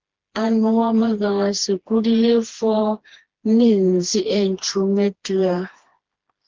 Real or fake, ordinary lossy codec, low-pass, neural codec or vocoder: fake; Opus, 16 kbps; 7.2 kHz; codec, 16 kHz, 2 kbps, FreqCodec, smaller model